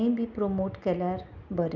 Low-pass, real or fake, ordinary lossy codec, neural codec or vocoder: 7.2 kHz; real; none; none